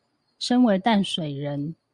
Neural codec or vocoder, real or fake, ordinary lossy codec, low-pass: none; real; Opus, 64 kbps; 10.8 kHz